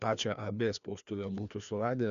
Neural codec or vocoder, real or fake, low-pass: codec, 16 kHz, 2 kbps, FreqCodec, larger model; fake; 7.2 kHz